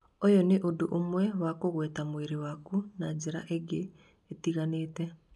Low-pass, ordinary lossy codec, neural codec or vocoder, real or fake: none; none; none; real